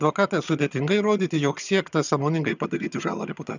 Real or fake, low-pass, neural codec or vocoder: fake; 7.2 kHz; vocoder, 22.05 kHz, 80 mel bands, HiFi-GAN